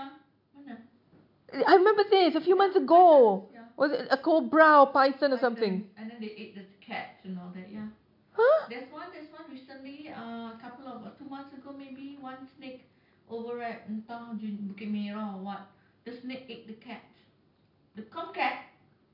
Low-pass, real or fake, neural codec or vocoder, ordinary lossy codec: 5.4 kHz; real; none; MP3, 48 kbps